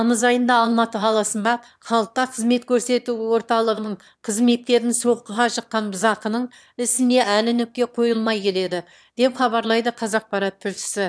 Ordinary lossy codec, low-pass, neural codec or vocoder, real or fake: none; none; autoencoder, 22.05 kHz, a latent of 192 numbers a frame, VITS, trained on one speaker; fake